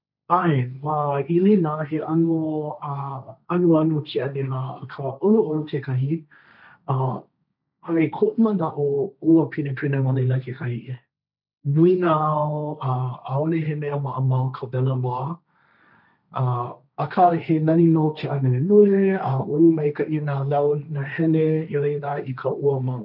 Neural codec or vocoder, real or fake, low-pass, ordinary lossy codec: codec, 16 kHz, 1.1 kbps, Voila-Tokenizer; fake; 5.4 kHz; none